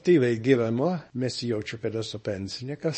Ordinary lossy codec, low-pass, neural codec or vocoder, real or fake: MP3, 32 kbps; 10.8 kHz; codec, 24 kHz, 0.9 kbps, WavTokenizer, small release; fake